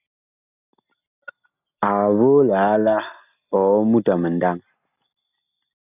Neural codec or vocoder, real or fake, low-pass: none; real; 3.6 kHz